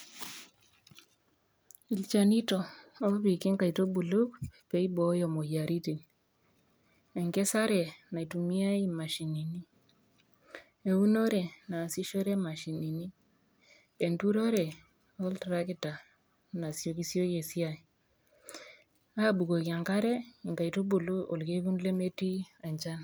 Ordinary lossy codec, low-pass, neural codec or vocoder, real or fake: none; none; none; real